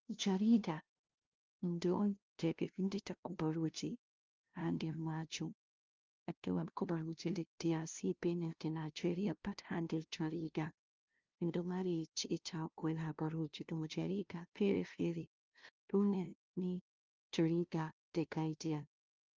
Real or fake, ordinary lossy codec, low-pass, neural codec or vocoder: fake; Opus, 24 kbps; 7.2 kHz; codec, 16 kHz, 0.5 kbps, FunCodec, trained on LibriTTS, 25 frames a second